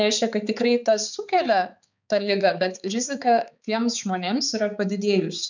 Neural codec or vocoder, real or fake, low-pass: codec, 16 kHz, 4 kbps, X-Codec, HuBERT features, trained on balanced general audio; fake; 7.2 kHz